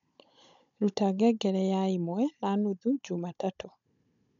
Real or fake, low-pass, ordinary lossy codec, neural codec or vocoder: fake; 7.2 kHz; none; codec, 16 kHz, 16 kbps, FunCodec, trained on Chinese and English, 50 frames a second